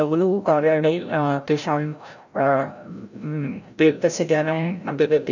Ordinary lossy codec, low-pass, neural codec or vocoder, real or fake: none; 7.2 kHz; codec, 16 kHz, 0.5 kbps, FreqCodec, larger model; fake